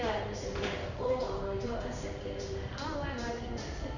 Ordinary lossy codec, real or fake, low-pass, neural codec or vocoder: none; fake; 7.2 kHz; codec, 16 kHz in and 24 kHz out, 1 kbps, XY-Tokenizer